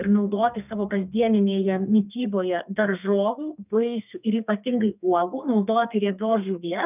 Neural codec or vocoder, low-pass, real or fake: codec, 32 kHz, 1.9 kbps, SNAC; 3.6 kHz; fake